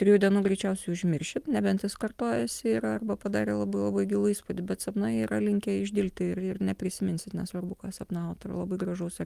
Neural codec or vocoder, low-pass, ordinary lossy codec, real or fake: none; 14.4 kHz; Opus, 32 kbps; real